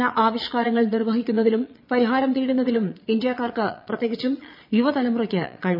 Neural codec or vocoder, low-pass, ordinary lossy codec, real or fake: vocoder, 22.05 kHz, 80 mel bands, Vocos; 5.4 kHz; none; fake